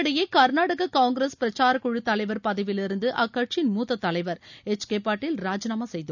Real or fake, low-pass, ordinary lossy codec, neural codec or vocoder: real; 7.2 kHz; none; none